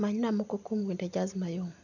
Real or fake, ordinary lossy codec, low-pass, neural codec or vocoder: real; none; 7.2 kHz; none